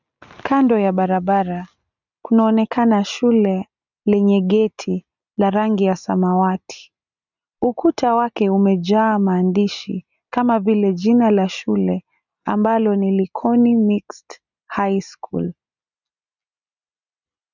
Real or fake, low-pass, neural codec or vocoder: real; 7.2 kHz; none